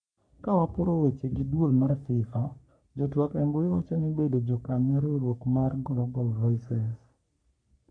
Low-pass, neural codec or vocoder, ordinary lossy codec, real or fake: 9.9 kHz; codec, 44.1 kHz, 3.4 kbps, Pupu-Codec; none; fake